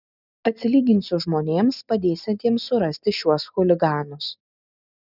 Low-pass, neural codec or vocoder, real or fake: 5.4 kHz; none; real